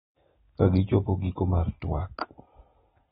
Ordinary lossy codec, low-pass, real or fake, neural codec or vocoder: AAC, 16 kbps; 19.8 kHz; real; none